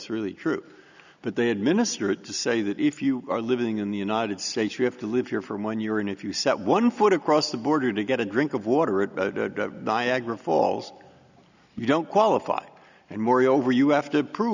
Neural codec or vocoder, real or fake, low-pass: none; real; 7.2 kHz